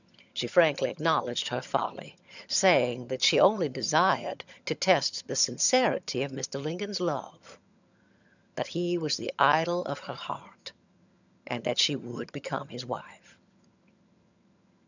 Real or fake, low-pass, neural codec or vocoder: fake; 7.2 kHz; vocoder, 22.05 kHz, 80 mel bands, HiFi-GAN